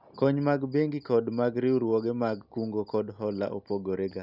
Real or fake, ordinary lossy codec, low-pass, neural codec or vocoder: real; none; 5.4 kHz; none